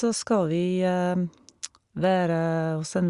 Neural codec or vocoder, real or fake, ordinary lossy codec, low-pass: none; real; Opus, 64 kbps; 10.8 kHz